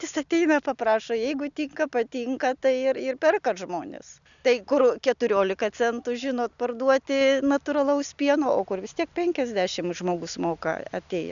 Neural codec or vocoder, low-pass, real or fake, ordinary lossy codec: none; 7.2 kHz; real; MP3, 96 kbps